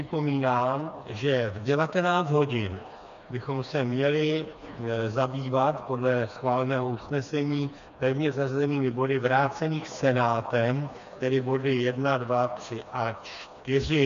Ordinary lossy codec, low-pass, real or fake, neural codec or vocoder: MP3, 64 kbps; 7.2 kHz; fake; codec, 16 kHz, 2 kbps, FreqCodec, smaller model